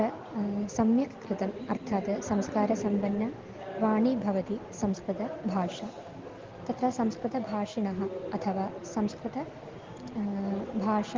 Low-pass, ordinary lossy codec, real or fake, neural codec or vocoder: 7.2 kHz; Opus, 16 kbps; real; none